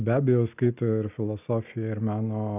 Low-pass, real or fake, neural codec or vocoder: 3.6 kHz; real; none